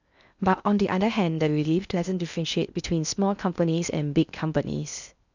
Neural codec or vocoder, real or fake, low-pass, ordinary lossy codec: codec, 16 kHz in and 24 kHz out, 0.6 kbps, FocalCodec, streaming, 2048 codes; fake; 7.2 kHz; none